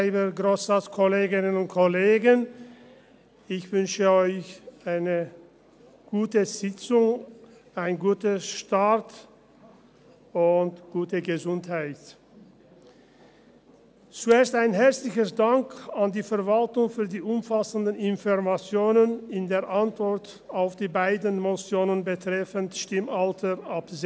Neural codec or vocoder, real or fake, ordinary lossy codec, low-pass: none; real; none; none